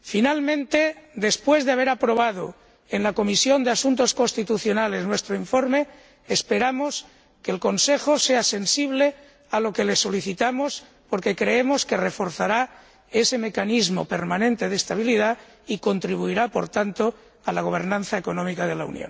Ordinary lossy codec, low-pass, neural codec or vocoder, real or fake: none; none; none; real